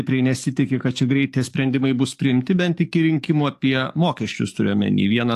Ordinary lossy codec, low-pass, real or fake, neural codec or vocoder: AAC, 64 kbps; 14.4 kHz; fake; codec, 44.1 kHz, 7.8 kbps, DAC